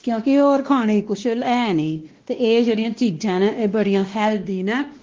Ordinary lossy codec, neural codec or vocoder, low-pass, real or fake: Opus, 16 kbps; codec, 16 kHz, 1 kbps, X-Codec, WavLM features, trained on Multilingual LibriSpeech; 7.2 kHz; fake